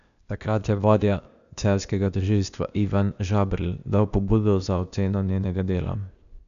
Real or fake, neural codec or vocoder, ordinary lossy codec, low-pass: fake; codec, 16 kHz, 0.8 kbps, ZipCodec; none; 7.2 kHz